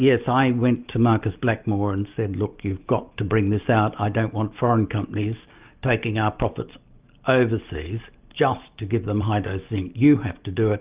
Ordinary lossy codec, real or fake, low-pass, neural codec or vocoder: Opus, 24 kbps; real; 3.6 kHz; none